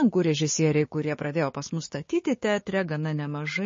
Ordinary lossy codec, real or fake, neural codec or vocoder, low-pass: MP3, 32 kbps; fake; codec, 16 kHz, 6 kbps, DAC; 7.2 kHz